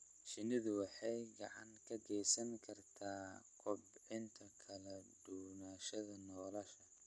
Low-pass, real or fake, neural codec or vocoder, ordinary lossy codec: none; real; none; none